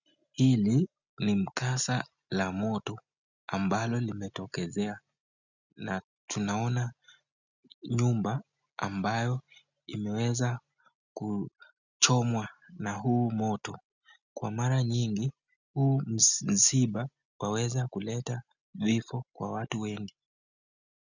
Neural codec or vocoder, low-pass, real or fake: none; 7.2 kHz; real